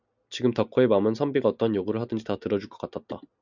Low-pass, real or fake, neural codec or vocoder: 7.2 kHz; real; none